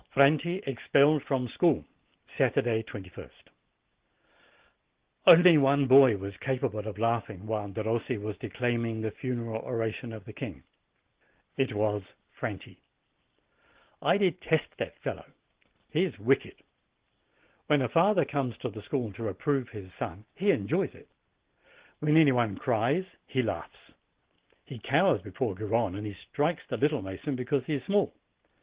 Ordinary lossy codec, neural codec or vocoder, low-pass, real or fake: Opus, 16 kbps; none; 3.6 kHz; real